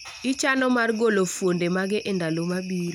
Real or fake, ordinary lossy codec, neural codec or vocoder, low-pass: real; none; none; 19.8 kHz